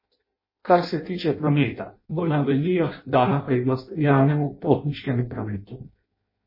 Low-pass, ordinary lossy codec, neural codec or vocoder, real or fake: 5.4 kHz; MP3, 24 kbps; codec, 16 kHz in and 24 kHz out, 0.6 kbps, FireRedTTS-2 codec; fake